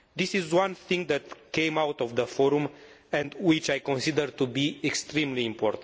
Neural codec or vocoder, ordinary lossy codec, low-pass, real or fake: none; none; none; real